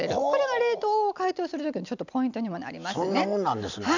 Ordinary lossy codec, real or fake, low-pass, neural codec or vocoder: none; real; 7.2 kHz; none